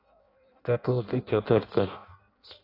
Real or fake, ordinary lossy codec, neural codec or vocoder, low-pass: fake; AAC, 24 kbps; codec, 16 kHz in and 24 kHz out, 0.6 kbps, FireRedTTS-2 codec; 5.4 kHz